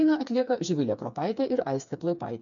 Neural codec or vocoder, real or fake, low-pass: codec, 16 kHz, 4 kbps, FreqCodec, smaller model; fake; 7.2 kHz